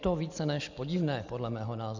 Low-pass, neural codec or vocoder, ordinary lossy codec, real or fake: 7.2 kHz; none; Opus, 64 kbps; real